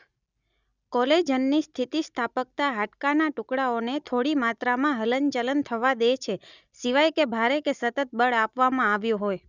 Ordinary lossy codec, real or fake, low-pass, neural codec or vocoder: none; real; 7.2 kHz; none